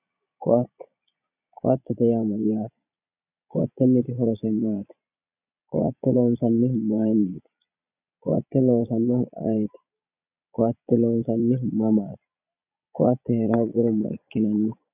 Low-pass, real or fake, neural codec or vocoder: 3.6 kHz; fake; vocoder, 22.05 kHz, 80 mel bands, Vocos